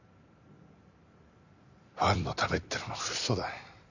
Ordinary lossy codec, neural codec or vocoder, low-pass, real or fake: none; vocoder, 44.1 kHz, 128 mel bands every 256 samples, BigVGAN v2; 7.2 kHz; fake